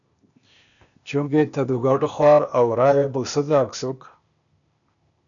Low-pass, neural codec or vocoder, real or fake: 7.2 kHz; codec, 16 kHz, 0.8 kbps, ZipCodec; fake